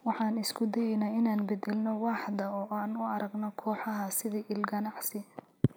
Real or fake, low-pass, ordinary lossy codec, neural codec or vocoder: real; none; none; none